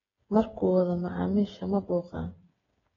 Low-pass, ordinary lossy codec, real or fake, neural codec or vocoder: 7.2 kHz; AAC, 24 kbps; fake; codec, 16 kHz, 8 kbps, FreqCodec, smaller model